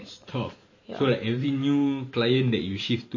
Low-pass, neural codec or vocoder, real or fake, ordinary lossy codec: 7.2 kHz; vocoder, 44.1 kHz, 128 mel bands every 512 samples, BigVGAN v2; fake; MP3, 32 kbps